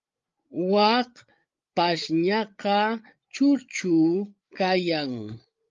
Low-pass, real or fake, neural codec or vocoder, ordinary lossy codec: 7.2 kHz; fake; codec, 16 kHz, 8 kbps, FreqCodec, larger model; Opus, 32 kbps